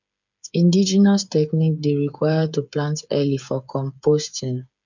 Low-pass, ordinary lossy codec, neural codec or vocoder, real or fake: 7.2 kHz; none; codec, 16 kHz, 8 kbps, FreqCodec, smaller model; fake